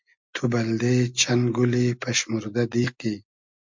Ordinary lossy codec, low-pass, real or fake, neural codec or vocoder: MP3, 64 kbps; 7.2 kHz; real; none